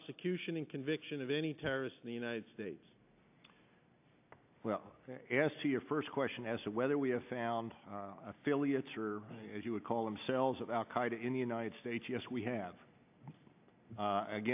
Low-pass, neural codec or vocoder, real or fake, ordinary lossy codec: 3.6 kHz; none; real; AAC, 32 kbps